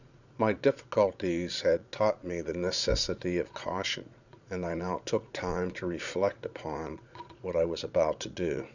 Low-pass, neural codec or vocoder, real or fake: 7.2 kHz; vocoder, 22.05 kHz, 80 mel bands, Vocos; fake